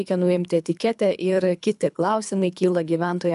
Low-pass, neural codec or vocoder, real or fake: 10.8 kHz; codec, 24 kHz, 3 kbps, HILCodec; fake